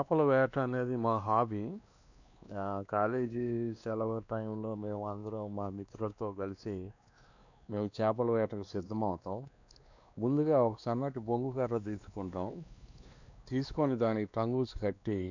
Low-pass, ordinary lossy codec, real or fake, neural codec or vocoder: 7.2 kHz; none; fake; codec, 16 kHz, 2 kbps, X-Codec, WavLM features, trained on Multilingual LibriSpeech